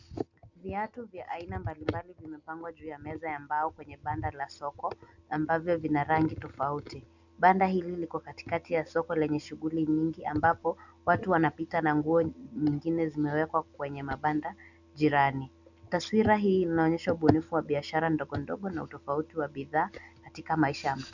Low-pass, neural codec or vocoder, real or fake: 7.2 kHz; none; real